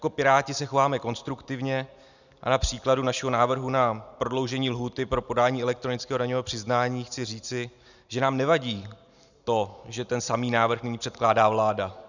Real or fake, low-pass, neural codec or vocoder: real; 7.2 kHz; none